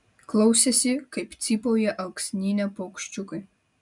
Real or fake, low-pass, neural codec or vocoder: real; 10.8 kHz; none